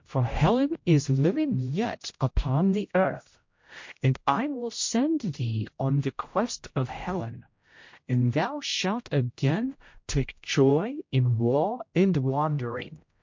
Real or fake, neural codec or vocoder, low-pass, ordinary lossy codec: fake; codec, 16 kHz, 0.5 kbps, X-Codec, HuBERT features, trained on general audio; 7.2 kHz; MP3, 48 kbps